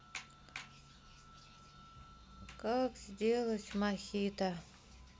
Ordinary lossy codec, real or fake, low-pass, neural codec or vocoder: none; real; none; none